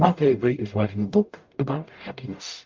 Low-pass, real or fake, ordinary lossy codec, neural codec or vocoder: 7.2 kHz; fake; Opus, 32 kbps; codec, 44.1 kHz, 0.9 kbps, DAC